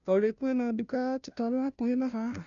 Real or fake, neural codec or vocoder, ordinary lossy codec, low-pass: fake; codec, 16 kHz, 0.5 kbps, FunCodec, trained on Chinese and English, 25 frames a second; none; 7.2 kHz